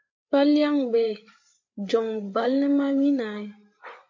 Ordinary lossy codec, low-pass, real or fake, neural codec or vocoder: MP3, 48 kbps; 7.2 kHz; fake; codec, 16 kHz, 8 kbps, FreqCodec, larger model